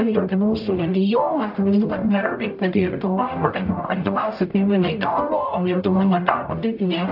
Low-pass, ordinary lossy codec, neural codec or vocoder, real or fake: 5.4 kHz; AAC, 48 kbps; codec, 44.1 kHz, 0.9 kbps, DAC; fake